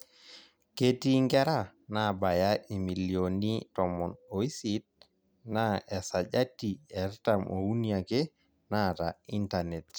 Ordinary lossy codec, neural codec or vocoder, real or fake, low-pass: none; none; real; none